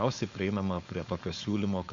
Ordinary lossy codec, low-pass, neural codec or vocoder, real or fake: MP3, 48 kbps; 7.2 kHz; codec, 16 kHz, 4.8 kbps, FACodec; fake